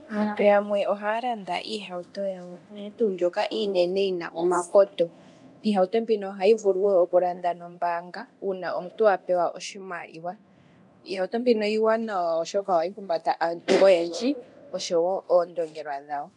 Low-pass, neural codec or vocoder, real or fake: 10.8 kHz; codec, 24 kHz, 0.9 kbps, DualCodec; fake